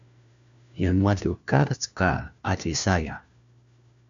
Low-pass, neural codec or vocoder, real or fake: 7.2 kHz; codec, 16 kHz, 1 kbps, FunCodec, trained on LibriTTS, 50 frames a second; fake